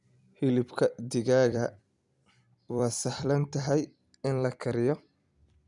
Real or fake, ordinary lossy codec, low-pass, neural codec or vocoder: fake; none; 10.8 kHz; vocoder, 44.1 kHz, 128 mel bands every 256 samples, BigVGAN v2